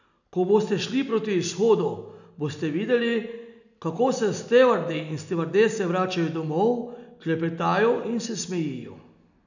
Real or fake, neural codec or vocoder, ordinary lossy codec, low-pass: real; none; none; 7.2 kHz